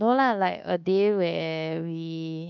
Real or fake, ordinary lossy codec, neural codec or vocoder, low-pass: fake; none; codec, 24 kHz, 1.2 kbps, DualCodec; 7.2 kHz